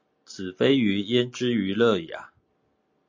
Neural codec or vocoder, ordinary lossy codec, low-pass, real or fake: none; MP3, 48 kbps; 7.2 kHz; real